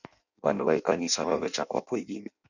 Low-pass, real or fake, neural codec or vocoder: 7.2 kHz; fake; codec, 16 kHz in and 24 kHz out, 0.6 kbps, FireRedTTS-2 codec